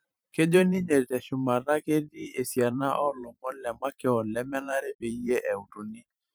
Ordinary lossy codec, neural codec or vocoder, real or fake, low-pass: none; vocoder, 44.1 kHz, 128 mel bands every 512 samples, BigVGAN v2; fake; none